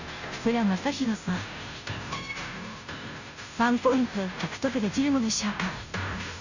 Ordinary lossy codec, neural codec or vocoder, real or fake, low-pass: none; codec, 16 kHz, 0.5 kbps, FunCodec, trained on Chinese and English, 25 frames a second; fake; 7.2 kHz